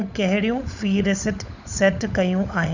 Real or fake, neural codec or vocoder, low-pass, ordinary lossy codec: fake; codec, 16 kHz, 16 kbps, FunCodec, trained on LibriTTS, 50 frames a second; 7.2 kHz; none